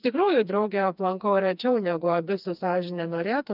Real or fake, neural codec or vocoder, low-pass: fake; codec, 16 kHz, 2 kbps, FreqCodec, smaller model; 5.4 kHz